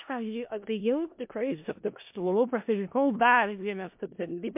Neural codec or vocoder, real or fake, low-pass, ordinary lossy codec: codec, 16 kHz in and 24 kHz out, 0.4 kbps, LongCat-Audio-Codec, four codebook decoder; fake; 3.6 kHz; MP3, 32 kbps